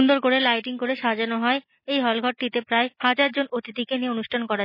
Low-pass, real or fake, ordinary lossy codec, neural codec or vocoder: 5.4 kHz; real; MP3, 24 kbps; none